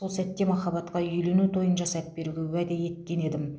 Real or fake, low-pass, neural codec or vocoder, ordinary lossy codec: real; none; none; none